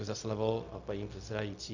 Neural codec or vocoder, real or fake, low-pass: codec, 16 kHz, 0.4 kbps, LongCat-Audio-Codec; fake; 7.2 kHz